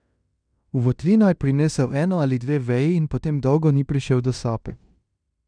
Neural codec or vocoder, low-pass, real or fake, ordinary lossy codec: codec, 16 kHz in and 24 kHz out, 0.9 kbps, LongCat-Audio-Codec, fine tuned four codebook decoder; 9.9 kHz; fake; none